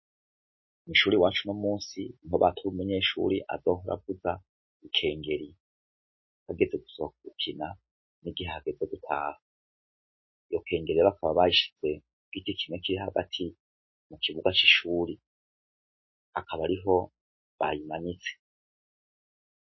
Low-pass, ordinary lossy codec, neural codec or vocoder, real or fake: 7.2 kHz; MP3, 24 kbps; none; real